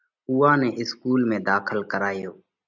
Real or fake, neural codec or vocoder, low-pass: real; none; 7.2 kHz